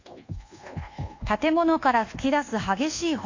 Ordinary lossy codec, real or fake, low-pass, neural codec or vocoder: AAC, 48 kbps; fake; 7.2 kHz; codec, 24 kHz, 1.2 kbps, DualCodec